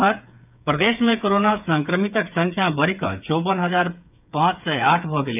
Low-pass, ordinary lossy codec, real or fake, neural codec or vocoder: 3.6 kHz; none; fake; codec, 16 kHz, 8 kbps, FreqCodec, smaller model